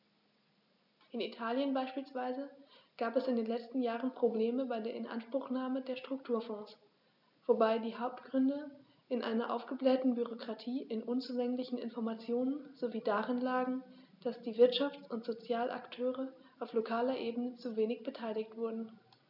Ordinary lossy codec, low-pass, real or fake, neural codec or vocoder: none; 5.4 kHz; real; none